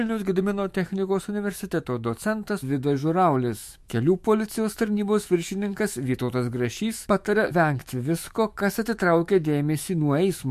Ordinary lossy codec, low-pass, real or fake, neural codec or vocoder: MP3, 64 kbps; 14.4 kHz; fake; autoencoder, 48 kHz, 128 numbers a frame, DAC-VAE, trained on Japanese speech